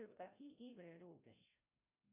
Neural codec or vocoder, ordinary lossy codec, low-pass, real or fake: codec, 16 kHz, 0.5 kbps, FreqCodec, larger model; AAC, 24 kbps; 3.6 kHz; fake